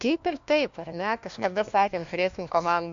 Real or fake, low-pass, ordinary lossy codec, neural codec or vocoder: fake; 7.2 kHz; AAC, 64 kbps; codec, 16 kHz, 1 kbps, FunCodec, trained on LibriTTS, 50 frames a second